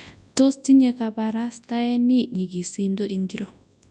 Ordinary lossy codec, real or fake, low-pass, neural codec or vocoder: none; fake; 10.8 kHz; codec, 24 kHz, 0.9 kbps, WavTokenizer, large speech release